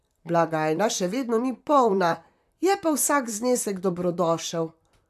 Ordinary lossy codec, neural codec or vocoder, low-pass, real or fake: AAC, 96 kbps; vocoder, 44.1 kHz, 128 mel bands, Pupu-Vocoder; 14.4 kHz; fake